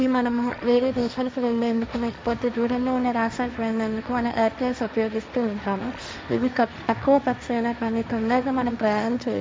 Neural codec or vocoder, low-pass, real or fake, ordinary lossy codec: codec, 16 kHz, 1.1 kbps, Voila-Tokenizer; none; fake; none